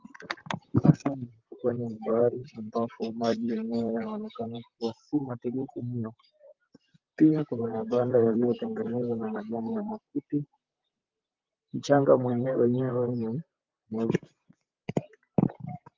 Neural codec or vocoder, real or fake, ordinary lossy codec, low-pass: vocoder, 44.1 kHz, 128 mel bands, Pupu-Vocoder; fake; Opus, 24 kbps; 7.2 kHz